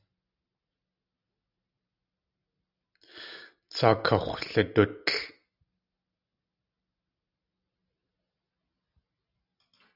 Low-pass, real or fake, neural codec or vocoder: 5.4 kHz; real; none